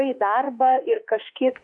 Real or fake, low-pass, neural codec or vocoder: fake; 10.8 kHz; autoencoder, 48 kHz, 32 numbers a frame, DAC-VAE, trained on Japanese speech